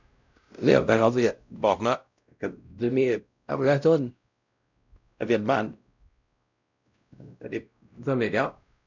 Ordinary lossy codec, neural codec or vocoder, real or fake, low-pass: none; codec, 16 kHz, 0.5 kbps, X-Codec, WavLM features, trained on Multilingual LibriSpeech; fake; 7.2 kHz